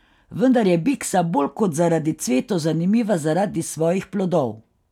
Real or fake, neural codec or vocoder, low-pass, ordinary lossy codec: real; none; 19.8 kHz; none